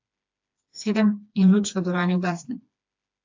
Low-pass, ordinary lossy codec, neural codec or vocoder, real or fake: 7.2 kHz; AAC, 48 kbps; codec, 16 kHz, 2 kbps, FreqCodec, smaller model; fake